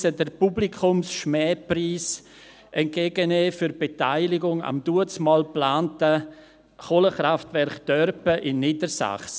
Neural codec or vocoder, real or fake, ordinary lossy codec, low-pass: none; real; none; none